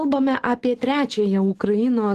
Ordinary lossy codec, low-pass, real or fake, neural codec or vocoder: Opus, 16 kbps; 14.4 kHz; real; none